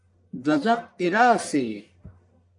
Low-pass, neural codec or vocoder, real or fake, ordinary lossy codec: 10.8 kHz; codec, 44.1 kHz, 1.7 kbps, Pupu-Codec; fake; AAC, 64 kbps